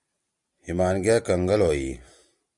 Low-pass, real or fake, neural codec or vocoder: 10.8 kHz; real; none